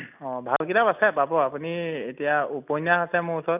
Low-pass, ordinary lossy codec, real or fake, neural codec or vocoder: 3.6 kHz; none; real; none